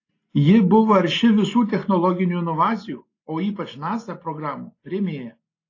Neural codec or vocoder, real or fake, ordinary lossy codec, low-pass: none; real; AAC, 32 kbps; 7.2 kHz